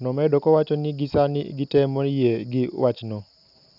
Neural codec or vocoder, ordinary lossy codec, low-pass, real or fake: none; none; 5.4 kHz; real